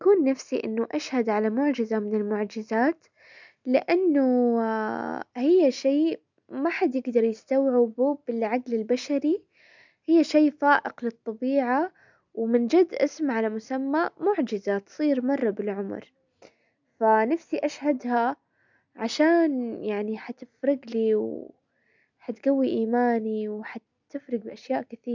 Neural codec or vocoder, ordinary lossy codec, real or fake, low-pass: none; none; real; 7.2 kHz